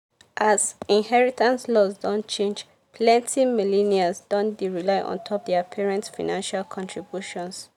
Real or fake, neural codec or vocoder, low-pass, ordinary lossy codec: real; none; 19.8 kHz; none